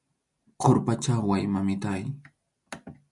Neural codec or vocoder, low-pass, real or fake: none; 10.8 kHz; real